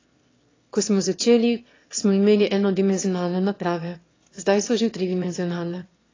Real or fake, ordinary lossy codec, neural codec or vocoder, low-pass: fake; AAC, 32 kbps; autoencoder, 22.05 kHz, a latent of 192 numbers a frame, VITS, trained on one speaker; 7.2 kHz